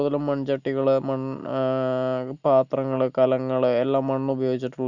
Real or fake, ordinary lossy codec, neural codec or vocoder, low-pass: real; none; none; 7.2 kHz